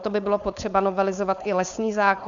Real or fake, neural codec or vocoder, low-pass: fake; codec, 16 kHz, 4.8 kbps, FACodec; 7.2 kHz